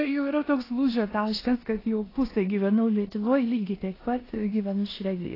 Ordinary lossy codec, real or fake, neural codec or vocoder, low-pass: AAC, 24 kbps; fake; codec, 16 kHz in and 24 kHz out, 0.9 kbps, LongCat-Audio-Codec, four codebook decoder; 5.4 kHz